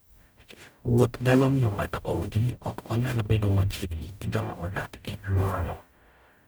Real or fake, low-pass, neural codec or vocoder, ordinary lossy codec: fake; none; codec, 44.1 kHz, 0.9 kbps, DAC; none